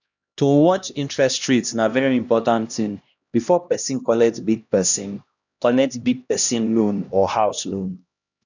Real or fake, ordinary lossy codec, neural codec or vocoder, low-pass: fake; none; codec, 16 kHz, 1 kbps, X-Codec, HuBERT features, trained on LibriSpeech; 7.2 kHz